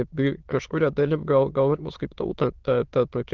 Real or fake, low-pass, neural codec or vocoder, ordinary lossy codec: fake; 7.2 kHz; autoencoder, 22.05 kHz, a latent of 192 numbers a frame, VITS, trained on many speakers; Opus, 16 kbps